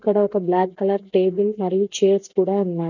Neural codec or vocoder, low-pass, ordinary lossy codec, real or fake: none; 7.2 kHz; none; real